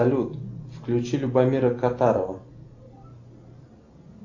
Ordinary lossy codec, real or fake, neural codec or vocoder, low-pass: AAC, 48 kbps; real; none; 7.2 kHz